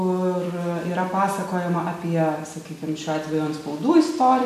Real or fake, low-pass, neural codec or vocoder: real; 14.4 kHz; none